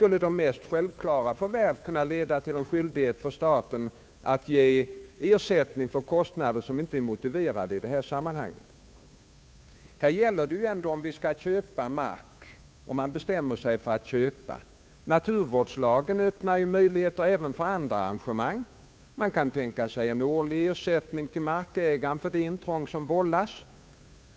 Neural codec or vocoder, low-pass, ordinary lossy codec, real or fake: codec, 16 kHz, 2 kbps, FunCodec, trained on Chinese and English, 25 frames a second; none; none; fake